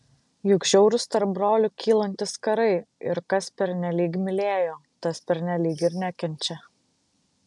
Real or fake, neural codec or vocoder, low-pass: real; none; 10.8 kHz